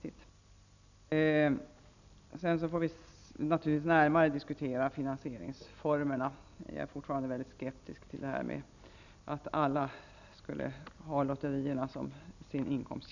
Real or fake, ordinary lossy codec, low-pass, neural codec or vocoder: real; none; 7.2 kHz; none